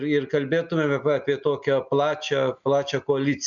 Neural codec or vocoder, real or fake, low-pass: none; real; 7.2 kHz